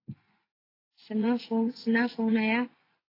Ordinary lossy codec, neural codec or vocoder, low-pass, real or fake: AAC, 24 kbps; vocoder, 24 kHz, 100 mel bands, Vocos; 5.4 kHz; fake